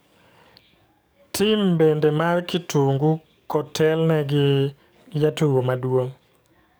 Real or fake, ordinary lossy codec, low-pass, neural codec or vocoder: fake; none; none; codec, 44.1 kHz, 7.8 kbps, DAC